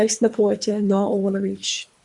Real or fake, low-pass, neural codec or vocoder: fake; 10.8 kHz; codec, 24 kHz, 3 kbps, HILCodec